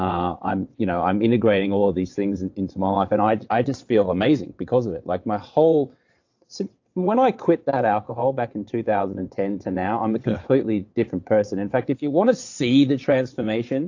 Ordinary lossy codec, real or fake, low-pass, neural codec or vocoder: AAC, 48 kbps; fake; 7.2 kHz; vocoder, 22.05 kHz, 80 mel bands, WaveNeXt